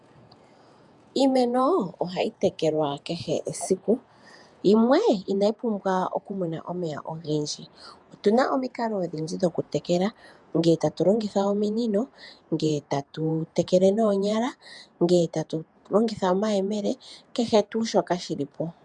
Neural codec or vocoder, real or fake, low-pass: vocoder, 48 kHz, 128 mel bands, Vocos; fake; 10.8 kHz